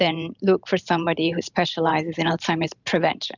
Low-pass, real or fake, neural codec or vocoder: 7.2 kHz; fake; vocoder, 44.1 kHz, 128 mel bands every 512 samples, BigVGAN v2